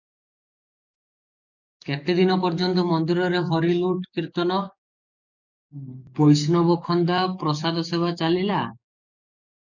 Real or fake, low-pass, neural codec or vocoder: fake; 7.2 kHz; codec, 44.1 kHz, 7.8 kbps, DAC